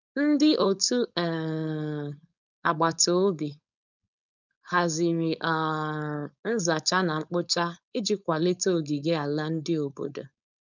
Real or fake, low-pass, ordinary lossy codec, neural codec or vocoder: fake; 7.2 kHz; none; codec, 16 kHz, 4.8 kbps, FACodec